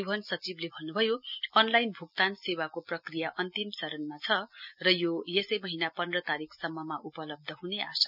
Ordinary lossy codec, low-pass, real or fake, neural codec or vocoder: none; 5.4 kHz; real; none